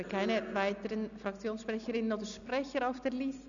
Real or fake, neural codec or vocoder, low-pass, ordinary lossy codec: real; none; 7.2 kHz; none